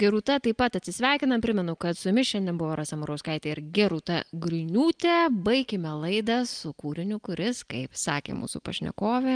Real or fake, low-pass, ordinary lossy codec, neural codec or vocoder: real; 9.9 kHz; Opus, 32 kbps; none